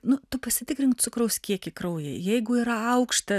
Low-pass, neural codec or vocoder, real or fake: 14.4 kHz; none; real